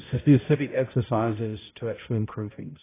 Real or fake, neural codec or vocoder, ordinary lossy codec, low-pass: fake; codec, 16 kHz, 0.5 kbps, X-Codec, HuBERT features, trained on balanced general audio; AAC, 16 kbps; 3.6 kHz